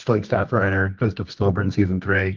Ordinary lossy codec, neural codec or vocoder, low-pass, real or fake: Opus, 24 kbps; codec, 24 kHz, 0.9 kbps, WavTokenizer, medium music audio release; 7.2 kHz; fake